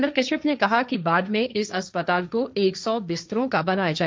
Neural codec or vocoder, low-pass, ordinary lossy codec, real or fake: codec, 16 kHz, 1.1 kbps, Voila-Tokenizer; none; none; fake